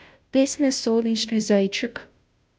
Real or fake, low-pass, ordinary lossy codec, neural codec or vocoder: fake; none; none; codec, 16 kHz, 0.5 kbps, FunCodec, trained on Chinese and English, 25 frames a second